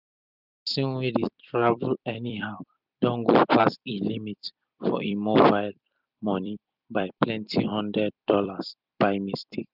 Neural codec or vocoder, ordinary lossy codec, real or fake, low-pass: vocoder, 44.1 kHz, 128 mel bands every 256 samples, BigVGAN v2; none; fake; 5.4 kHz